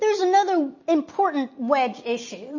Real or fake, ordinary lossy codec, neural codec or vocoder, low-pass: real; MP3, 32 kbps; none; 7.2 kHz